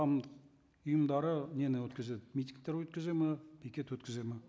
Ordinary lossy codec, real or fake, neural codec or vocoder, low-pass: none; real; none; none